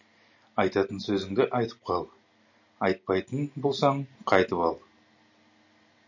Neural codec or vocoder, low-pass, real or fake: none; 7.2 kHz; real